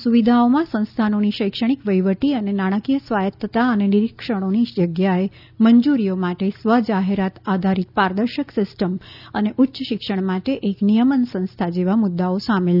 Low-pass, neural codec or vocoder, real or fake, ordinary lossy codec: 5.4 kHz; none; real; none